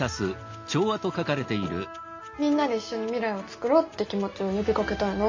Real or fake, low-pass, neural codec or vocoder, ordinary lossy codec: real; 7.2 kHz; none; MP3, 48 kbps